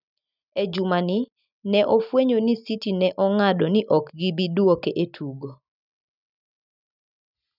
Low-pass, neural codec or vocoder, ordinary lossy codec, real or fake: 5.4 kHz; none; none; real